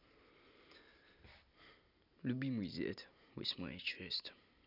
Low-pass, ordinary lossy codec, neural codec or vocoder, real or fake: 5.4 kHz; none; none; real